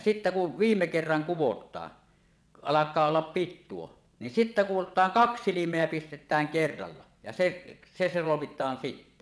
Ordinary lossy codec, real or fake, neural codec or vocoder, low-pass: none; fake; vocoder, 22.05 kHz, 80 mel bands, WaveNeXt; none